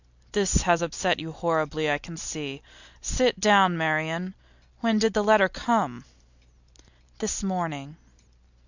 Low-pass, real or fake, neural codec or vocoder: 7.2 kHz; real; none